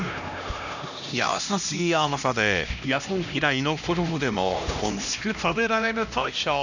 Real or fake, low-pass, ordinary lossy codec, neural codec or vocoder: fake; 7.2 kHz; none; codec, 16 kHz, 1 kbps, X-Codec, HuBERT features, trained on LibriSpeech